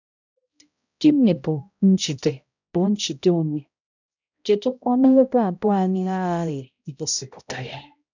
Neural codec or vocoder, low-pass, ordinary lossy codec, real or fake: codec, 16 kHz, 0.5 kbps, X-Codec, HuBERT features, trained on balanced general audio; 7.2 kHz; none; fake